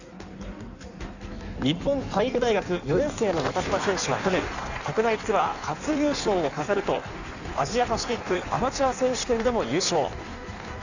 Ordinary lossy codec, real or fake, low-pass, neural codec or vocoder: none; fake; 7.2 kHz; codec, 16 kHz in and 24 kHz out, 1.1 kbps, FireRedTTS-2 codec